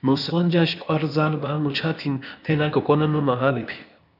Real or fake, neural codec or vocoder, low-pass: fake; codec, 16 kHz, 0.8 kbps, ZipCodec; 5.4 kHz